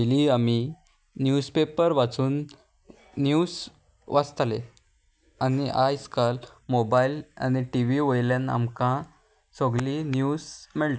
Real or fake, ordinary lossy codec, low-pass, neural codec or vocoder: real; none; none; none